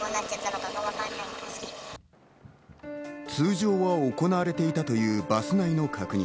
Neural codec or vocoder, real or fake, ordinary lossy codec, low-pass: none; real; none; none